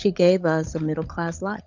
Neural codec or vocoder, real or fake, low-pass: codec, 16 kHz, 16 kbps, FunCodec, trained on LibriTTS, 50 frames a second; fake; 7.2 kHz